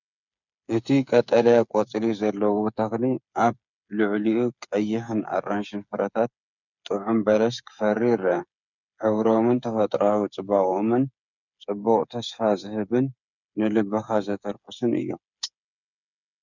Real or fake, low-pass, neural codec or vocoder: fake; 7.2 kHz; codec, 16 kHz, 8 kbps, FreqCodec, smaller model